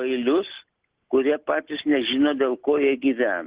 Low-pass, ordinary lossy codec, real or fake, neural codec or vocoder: 3.6 kHz; Opus, 16 kbps; real; none